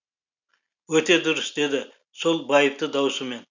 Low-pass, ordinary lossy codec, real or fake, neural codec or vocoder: 7.2 kHz; none; real; none